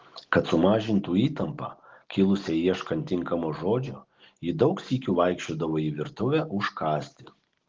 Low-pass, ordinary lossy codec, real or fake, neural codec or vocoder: 7.2 kHz; Opus, 16 kbps; real; none